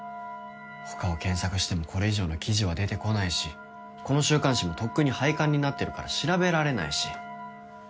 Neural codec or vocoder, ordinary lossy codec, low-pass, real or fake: none; none; none; real